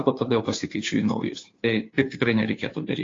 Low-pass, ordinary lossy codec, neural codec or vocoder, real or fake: 7.2 kHz; AAC, 32 kbps; codec, 16 kHz, 2 kbps, FunCodec, trained on Chinese and English, 25 frames a second; fake